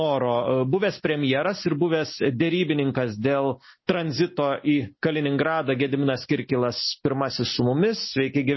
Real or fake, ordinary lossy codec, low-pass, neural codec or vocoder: real; MP3, 24 kbps; 7.2 kHz; none